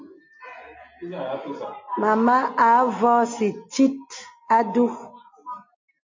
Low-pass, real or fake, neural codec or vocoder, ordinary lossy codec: 7.2 kHz; real; none; MP3, 48 kbps